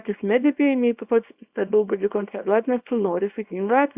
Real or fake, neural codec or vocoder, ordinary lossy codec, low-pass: fake; codec, 24 kHz, 0.9 kbps, WavTokenizer, small release; Opus, 64 kbps; 3.6 kHz